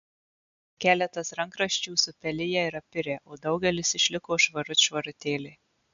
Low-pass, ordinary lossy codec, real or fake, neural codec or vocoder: 7.2 kHz; MP3, 64 kbps; real; none